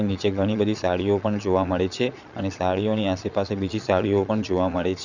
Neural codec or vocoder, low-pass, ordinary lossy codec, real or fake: vocoder, 22.05 kHz, 80 mel bands, Vocos; 7.2 kHz; none; fake